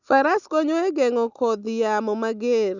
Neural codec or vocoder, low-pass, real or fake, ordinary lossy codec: none; 7.2 kHz; real; none